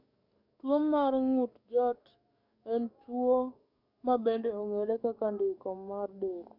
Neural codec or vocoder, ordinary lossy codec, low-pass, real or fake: codec, 44.1 kHz, 7.8 kbps, DAC; none; 5.4 kHz; fake